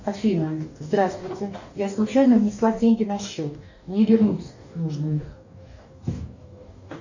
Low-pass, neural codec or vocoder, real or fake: 7.2 kHz; codec, 44.1 kHz, 2.6 kbps, DAC; fake